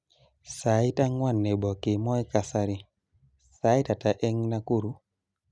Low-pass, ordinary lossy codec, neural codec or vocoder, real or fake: none; none; none; real